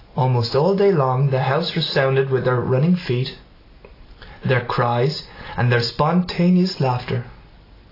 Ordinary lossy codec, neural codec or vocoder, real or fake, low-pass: AAC, 24 kbps; none; real; 5.4 kHz